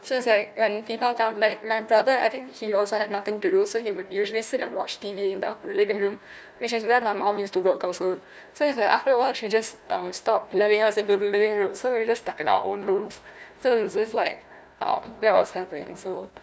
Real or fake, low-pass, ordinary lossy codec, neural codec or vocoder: fake; none; none; codec, 16 kHz, 1 kbps, FunCodec, trained on Chinese and English, 50 frames a second